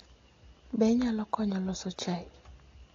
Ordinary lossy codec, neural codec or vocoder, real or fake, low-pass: AAC, 32 kbps; none; real; 7.2 kHz